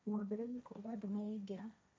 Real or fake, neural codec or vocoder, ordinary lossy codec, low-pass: fake; codec, 16 kHz, 1.1 kbps, Voila-Tokenizer; none; none